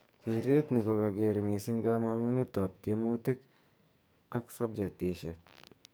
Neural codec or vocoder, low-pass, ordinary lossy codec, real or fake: codec, 44.1 kHz, 2.6 kbps, SNAC; none; none; fake